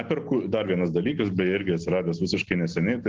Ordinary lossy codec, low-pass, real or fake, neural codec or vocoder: Opus, 16 kbps; 7.2 kHz; real; none